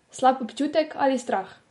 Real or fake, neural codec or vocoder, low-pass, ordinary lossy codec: real; none; 19.8 kHz; MP3, 48 kbps